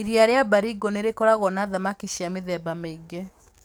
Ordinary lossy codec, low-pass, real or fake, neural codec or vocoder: none; none; fake; codec, 44.1 kHz, 7.8 kbps, DAC